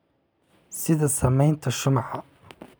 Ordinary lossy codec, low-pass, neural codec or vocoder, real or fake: none; none; none; real